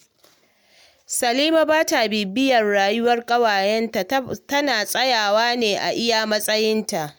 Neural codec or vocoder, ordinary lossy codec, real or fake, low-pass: none; none; real; none